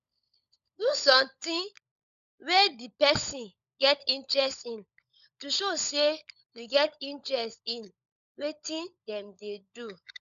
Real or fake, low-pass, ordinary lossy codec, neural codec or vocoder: fake; 7.2 kHz; none; codec, 16 kHz, 16 kbps, FunCodec, trained on LibriTTS, 50 frames a second